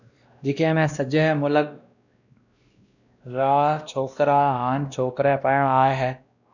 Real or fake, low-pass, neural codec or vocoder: fake; 7.2 kHz; codec, 16 kHz, 1 kbps, X-Codec, WavLM features, trained on Multilingual LibriSpeech